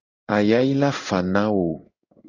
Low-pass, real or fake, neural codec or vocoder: 7.2 kHz; fake; codec, 16 kHz in and 24 kHz out, 1 kbps, XY-Tokenizer